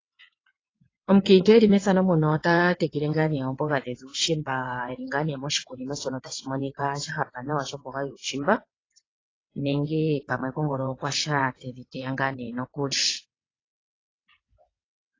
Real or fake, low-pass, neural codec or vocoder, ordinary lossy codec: fake; 7.2 kHz; vocoder, 22.05 kHz, 80 mel bands, WaveNeXt; AAC, 32 kbps